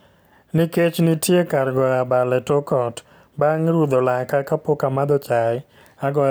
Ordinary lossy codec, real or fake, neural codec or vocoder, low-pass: none; real; none; none